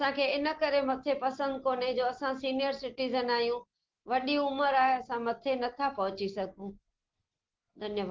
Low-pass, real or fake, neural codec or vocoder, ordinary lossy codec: 7.2 kHz; real; none; Opus, 16 kbps